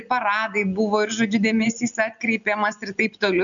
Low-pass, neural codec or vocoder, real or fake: 7.2 kHz; none; real